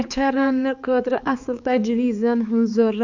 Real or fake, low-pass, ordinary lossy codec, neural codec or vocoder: fake; 7.2 kHz; none; codec, 16 kHz, 4 kbps, X-Codec, HuBERT features, trained on balanced general audio